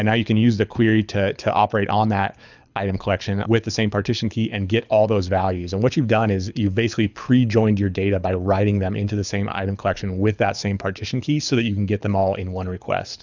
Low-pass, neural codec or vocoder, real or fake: 7.2 kHz; codec, 24 kHz, 6 kbps, HILCodec; fake